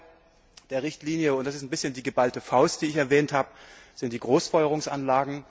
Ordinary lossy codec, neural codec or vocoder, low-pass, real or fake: none; none; none; real